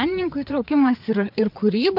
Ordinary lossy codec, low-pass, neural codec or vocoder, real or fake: MP3, 48 kbps; 5.4 kHz; codec, 16 kHz, 4 kbps, FreqCodec, larger model; fake